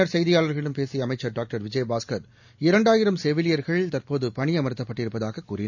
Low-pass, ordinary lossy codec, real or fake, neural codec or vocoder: 7.2 kHz; none; real; none